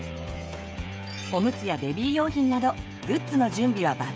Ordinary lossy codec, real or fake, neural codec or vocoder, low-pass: none; fake; codec, 16 kHz, 16 kbps, FreqCodec, smaller model; none